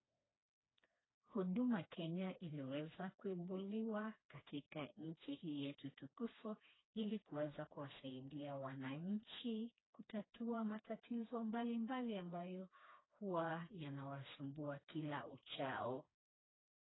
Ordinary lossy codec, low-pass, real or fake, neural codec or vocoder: AAC, 16 kbps; 7.2 kHz; fake; codec, 16 kHz, 2 kbps, FreqCodec, smaller model